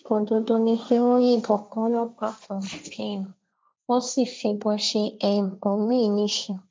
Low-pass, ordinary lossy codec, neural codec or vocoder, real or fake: none; none; codec, 16 kHz, 1.1 kbps, Voila-Tokenizer; fake